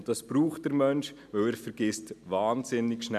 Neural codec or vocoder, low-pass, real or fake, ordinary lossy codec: none; 14.4 kHz; real; none